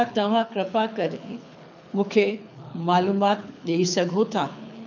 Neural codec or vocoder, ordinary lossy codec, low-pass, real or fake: codec, 24 kHz, 6 kbps, HILCodec; none; 7.2 kHz; fake